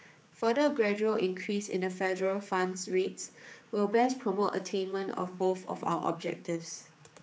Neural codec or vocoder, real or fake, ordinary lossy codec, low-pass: codec, 16 kHz, 4 kbps, X-Codec, HuBERT features, trained on general audio; fake; none; none